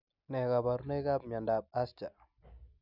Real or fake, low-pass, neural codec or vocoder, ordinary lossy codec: real; 5.4 kHz; none; none